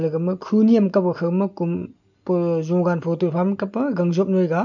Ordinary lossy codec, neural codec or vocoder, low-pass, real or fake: none; none; 7.2 kHz; real